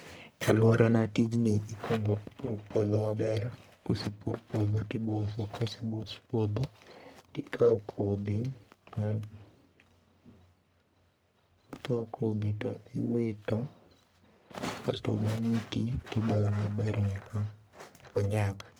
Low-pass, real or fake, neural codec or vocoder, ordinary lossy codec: none; fake; codec, 44.1 kHz, 1.7 kbps, Pupu-Codec; none